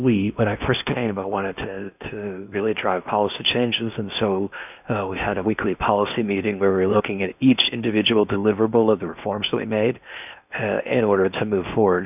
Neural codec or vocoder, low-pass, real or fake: codec, 16 kHz in and 24 kHz out, 0.8 kbps, FocalCodec, streaming, 65536 codes; 3.6 kHz; fake